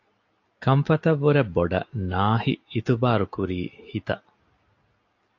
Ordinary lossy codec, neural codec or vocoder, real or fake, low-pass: AAC, 48 kbps; none; real; 7.2 kHz